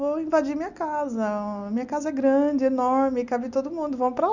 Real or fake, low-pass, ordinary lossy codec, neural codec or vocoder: real; 7.2 kHz; none; none